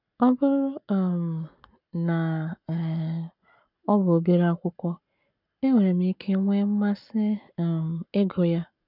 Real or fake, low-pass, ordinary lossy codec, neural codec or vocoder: fake; 5.4 kHz; none; codec, 44.1 kHz, 7.8 kbps, Pupu-Codec